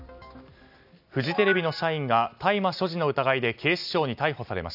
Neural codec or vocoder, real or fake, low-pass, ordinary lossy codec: none; real; 5.4 kHz; none